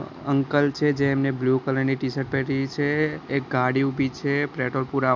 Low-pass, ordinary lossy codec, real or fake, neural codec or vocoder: 7.2 kHz; none; real; none